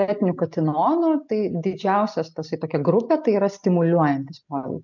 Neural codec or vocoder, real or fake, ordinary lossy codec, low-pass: none; real; MP3, 64 kbps; 7.2 kHz